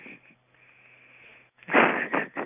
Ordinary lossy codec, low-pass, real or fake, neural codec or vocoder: none; 3.6 kHz; real; none